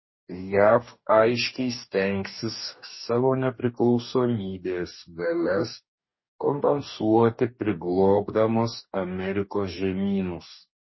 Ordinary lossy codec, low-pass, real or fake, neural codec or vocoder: MP3, 24 kbps; 7.2 kHz; fake; codec, 44.1 kHz, 2.6 kbps, DAC